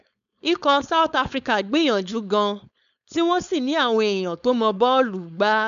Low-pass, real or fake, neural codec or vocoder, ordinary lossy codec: 7.2 kHz; fake; codec, 16 kHz, 4.8 kbps, FACodec; none